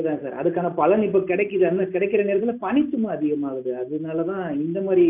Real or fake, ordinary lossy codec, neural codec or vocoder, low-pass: real; none; none; 3.6 kHz